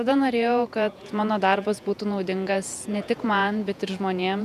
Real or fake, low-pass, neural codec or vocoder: fake; 14.4 kHz; vocoder, 48 kHz, 128 mel bands, Vocos